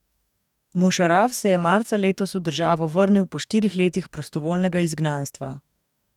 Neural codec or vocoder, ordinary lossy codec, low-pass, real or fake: codec, 44.1 kHz, 2.6 kbps, DAC; none; 19.8 kHz; fake